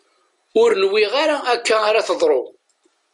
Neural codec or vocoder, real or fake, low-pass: none; real; 10.8 kHz